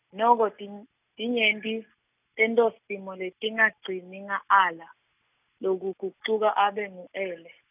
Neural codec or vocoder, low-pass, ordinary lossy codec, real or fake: none; 3.6 kHz; none; real